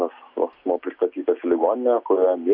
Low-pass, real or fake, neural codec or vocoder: 5.4 kHz; real; none